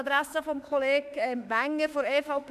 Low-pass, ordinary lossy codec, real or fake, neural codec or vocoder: 14.4 kHz; none; fake; autoencoder, 48 kHz, 32 numbers a frame, DAC-VAE, trained on Japanese speech